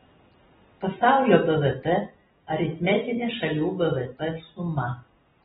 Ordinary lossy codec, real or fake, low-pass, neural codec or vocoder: AAC, 16 kbps; real; 19.8 kHz; none